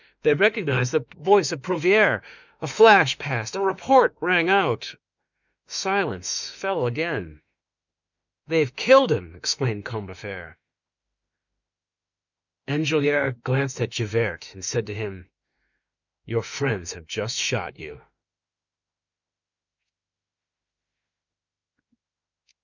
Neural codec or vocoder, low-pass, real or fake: autoencoder, 48 kHz, 32 numbers a frame, DAC-VAE, trained on Japanese speech; 7.2 kHz; fake